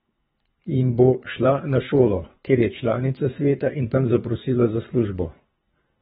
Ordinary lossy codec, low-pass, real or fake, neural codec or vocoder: AAC, 16 kbps; 10.8 kHz; fake; codec, 24 kHz, 3 kbps, HILCodec